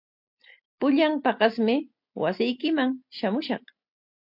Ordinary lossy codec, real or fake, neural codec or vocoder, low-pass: MP3, 48 kbps; real; none; 5.4 kHz